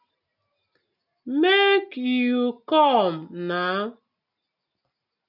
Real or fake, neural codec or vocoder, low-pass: real; none; 5.4 kHz